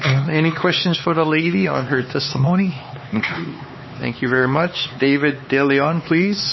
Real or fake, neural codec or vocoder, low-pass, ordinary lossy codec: fake; codec, 16 kHz, 4 kbps, X-Codec, HuBERT features, trained on LibriSpeech; 7.2 kHz; MP3, 24 kbps